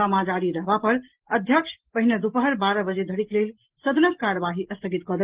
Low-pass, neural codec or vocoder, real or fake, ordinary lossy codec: 3.6 kHz; none; real; Opus, 16 kbps